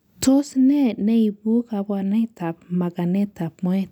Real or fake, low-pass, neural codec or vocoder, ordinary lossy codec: real; 19.8 kHz; none; none